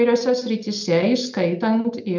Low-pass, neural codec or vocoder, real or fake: 7.2 kHz; none; real